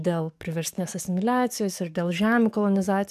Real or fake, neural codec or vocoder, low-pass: fake; codec, 44.1 kHz, 7.8 kbps, DAC; 14.4 kHz